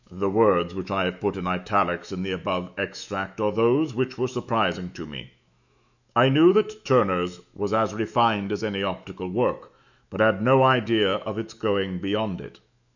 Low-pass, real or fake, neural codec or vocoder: 7.2 kHz; fake; autoencoder, 48 kHz, 128 numbers a frame, DAC-VAE, trained on Japanese speech